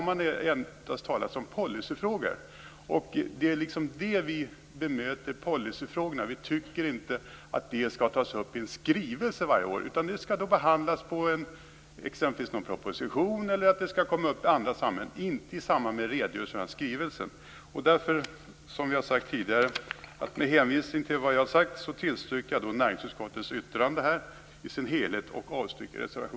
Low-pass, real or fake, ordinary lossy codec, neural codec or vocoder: none; real; none; none